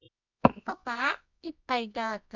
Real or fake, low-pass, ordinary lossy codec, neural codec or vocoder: fake; 7.2 kHz; none; codec, 24 kHz, 0.9 kbps, WavTokenizer, medium music audio release